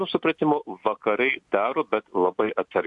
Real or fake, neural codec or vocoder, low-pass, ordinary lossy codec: real; none; 10.8 kHz; AAC, 64 kbps